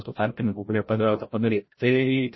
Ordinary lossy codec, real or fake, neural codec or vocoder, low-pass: MP3, 24 kbps; fake; codec, 16 kHz, 0.5 kbps, FreqCodec, larger model; 7.2 kHz